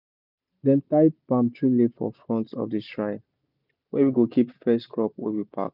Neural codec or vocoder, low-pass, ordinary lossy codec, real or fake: none; 5.4 kHz; none; real